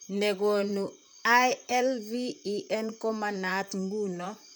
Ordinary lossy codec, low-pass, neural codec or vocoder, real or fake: none; none; vocoder, 44.1 kHz, 128 mel bands, Pupu-Vocoder; fake